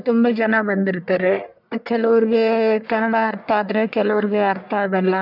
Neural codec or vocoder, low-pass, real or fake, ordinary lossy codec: codec, 44.1 kHz, 1.7 kbps, Pupu-Codec; 5.4 kHz; fake; none